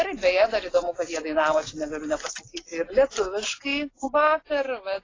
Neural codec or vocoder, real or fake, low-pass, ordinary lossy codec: none; real; 7.2 kHz; AAC, 32 kbps